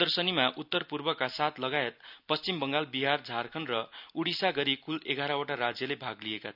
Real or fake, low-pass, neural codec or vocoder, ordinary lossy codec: real; 5.4 kHz; none; none